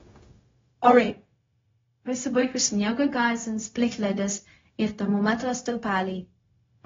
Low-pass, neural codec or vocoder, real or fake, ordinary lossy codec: 7.2 kHz; codec, 16 kHz, 0.4 kbps, LongCat-Audio-Codec; fake; AAC, 24 kbps